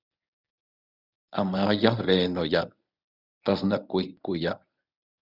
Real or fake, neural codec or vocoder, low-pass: fake; codec, 24 kHz, 0.9 kbps, WavTokenizer, medium speech release version 1; 5.4 kHz